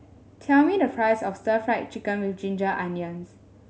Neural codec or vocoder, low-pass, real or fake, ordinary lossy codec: none; none; real; none